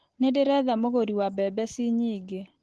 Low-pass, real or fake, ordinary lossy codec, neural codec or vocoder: 10.8 kHz; real; Opus, 16 kbps; none